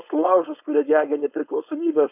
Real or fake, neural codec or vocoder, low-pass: fake; codec, 16 kHz, 4.8 kbps, FACodec; 3.6 kHz